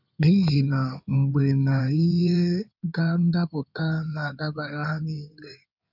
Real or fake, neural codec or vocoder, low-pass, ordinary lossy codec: fake; codec, 16 kHz in and 24 kHz out, 2.2 kbps, FireRedTTS-2 codec; 5.4 kHz; none